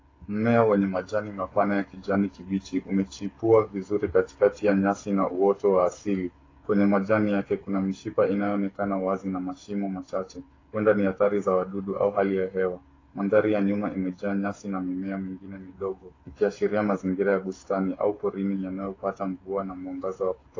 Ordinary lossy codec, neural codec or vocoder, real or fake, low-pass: AAC, 32 kbps; codec, 16 kHz, 8 kbps, FreqCodec, smaller model; fake; 7.2 kHz